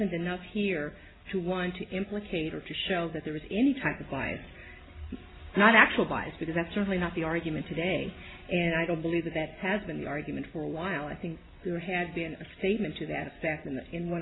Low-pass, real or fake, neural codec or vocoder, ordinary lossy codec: 7.2 kHz; real; none; AAC, 16 kbps